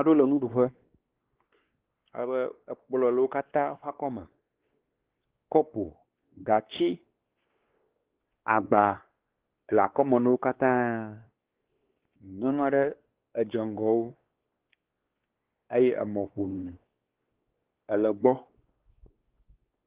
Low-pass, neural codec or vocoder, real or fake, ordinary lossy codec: 3.6 kHz; codec, 16 kHz, 2 kbps, X-Codec, WavLM features, trained on Multilingual LibriSpeech; fake; Opus, 16 kbps